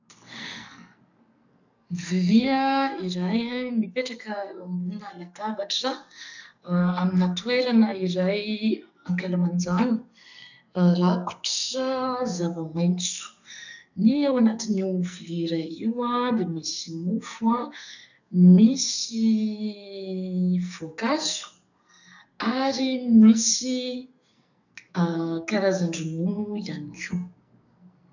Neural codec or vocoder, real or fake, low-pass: codec, 44.1 kHz, 2.6 kbps, SNAC; fake; 7.2 kHz